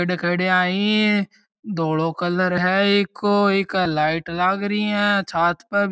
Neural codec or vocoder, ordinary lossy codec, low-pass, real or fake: none; none; none; real